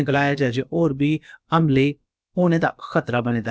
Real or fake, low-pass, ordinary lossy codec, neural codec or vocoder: fake; none; none; codec, 16 kHz, about 1 kbps, DyCAST, with the encoder's durations